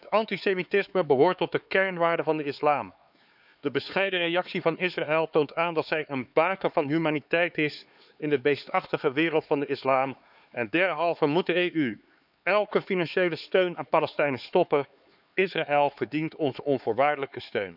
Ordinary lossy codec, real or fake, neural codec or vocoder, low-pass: none; fake; codec, 16 kHz, 4 kbps, X-Codec, HuBERT features, trained on LibriSpeech; 5.4 kHz